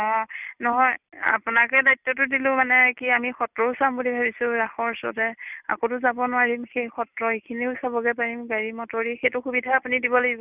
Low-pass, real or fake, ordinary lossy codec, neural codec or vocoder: 3.6 kHz; real; none; none